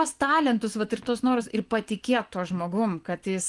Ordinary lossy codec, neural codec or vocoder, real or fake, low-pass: Opus, 24 kbps; vocoder, 24 kHz, 100 mel bands, Vocos; fake; 10.8 kHz